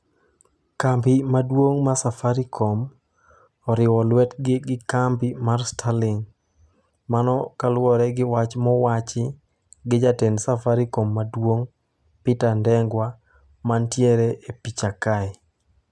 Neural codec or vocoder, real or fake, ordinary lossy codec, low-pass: none; real; none; none